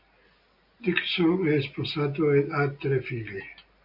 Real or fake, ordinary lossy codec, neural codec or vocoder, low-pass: real; MP3, 48 kbps; none; 5.4 kHz